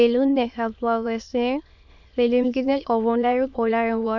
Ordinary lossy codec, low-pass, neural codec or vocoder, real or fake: none; 7.2 kHz; autoencoder, 22.05 kHz, a latent of 192 numbers a frame, VITS, trained on many speakers; fake